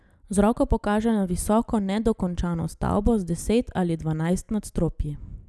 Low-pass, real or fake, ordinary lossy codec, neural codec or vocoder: none; real; none; none